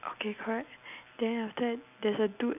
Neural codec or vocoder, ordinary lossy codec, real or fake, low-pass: none; none; real; 3.6 kHz